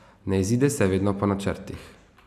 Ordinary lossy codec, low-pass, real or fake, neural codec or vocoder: none; 14.4 kHz; real; none